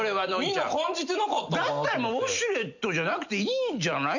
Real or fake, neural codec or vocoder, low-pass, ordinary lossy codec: real; none; 7.2 kHz; none